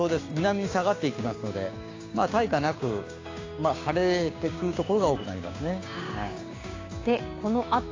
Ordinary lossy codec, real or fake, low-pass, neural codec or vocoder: AAC, 32 kbps; fake; 7.2 kHz; codec, 16 kHz, 6 kbps, DAC